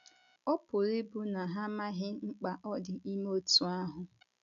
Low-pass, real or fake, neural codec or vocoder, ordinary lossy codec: 7.2 kHz; real; none; none